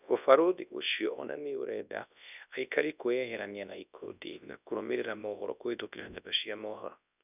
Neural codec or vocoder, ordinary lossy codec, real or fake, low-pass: codec, 24 kHz, 0.9 kbps, WavTokenizer, large speech release; none; fake; 3.6 kHz